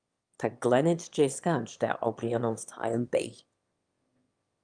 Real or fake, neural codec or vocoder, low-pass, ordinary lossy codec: fake; autoencoder, 22.05 kHz, a latent of 192 numbers a frame, VITS, trained on one speaker; 9.9 kHz; Opus, 32 kbps